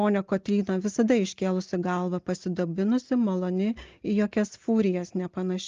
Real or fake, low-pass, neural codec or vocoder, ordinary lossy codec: real; 7.2 kHz; none; Opus, 32 kbps